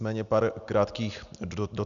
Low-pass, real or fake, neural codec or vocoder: 7.2 kHz; real; none